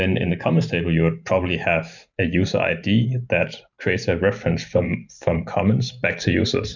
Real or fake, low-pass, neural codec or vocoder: fake; 7.2 kHz; vocoder, 44.1 kHz, 128 mel bands every 512 samples, BigVGAN v2